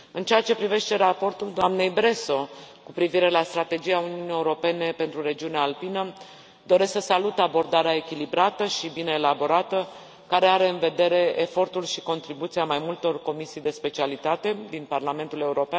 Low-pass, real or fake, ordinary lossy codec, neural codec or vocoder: none; real; none; none